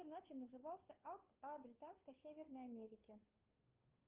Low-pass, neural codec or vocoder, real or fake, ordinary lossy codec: 3.6 kHz; codec, 16 kHz, 2 kbps, FunCodec, trained on Chinese and English, 25 frames a second; fake; Opus, 32 kbps